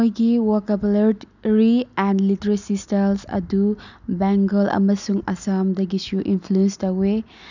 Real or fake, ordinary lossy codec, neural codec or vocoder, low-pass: real; none; none; 7.2 kHz